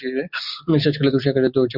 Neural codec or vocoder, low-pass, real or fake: none; 5.4 kHz; real